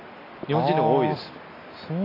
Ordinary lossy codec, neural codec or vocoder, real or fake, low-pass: AAC, 24 kbps; none; real; 5.4 kHz